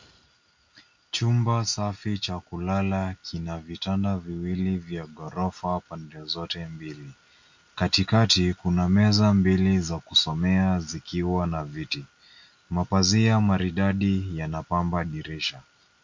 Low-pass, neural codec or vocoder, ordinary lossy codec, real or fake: 7.2 kHz; none; MP3, 48 kbps; real